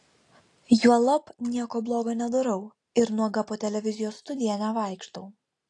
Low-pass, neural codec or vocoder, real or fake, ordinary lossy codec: 10.8 kHz; none; real; AAC, 48 kbps